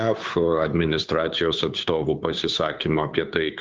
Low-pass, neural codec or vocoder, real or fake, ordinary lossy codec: 7.2 kHz; codec, 16 kHz, 2 kbps, FunCodec, trained on Chinese and English, 25 frames a second; fake; Opus, 24 kbps